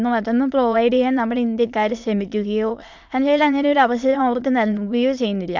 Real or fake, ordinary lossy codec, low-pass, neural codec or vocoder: fake; MP3, 64 kbps; 7.2 kHz; autoencoder, 22.05 kHz, a latent of 192 numbers a frame, VITS, trained on many speakers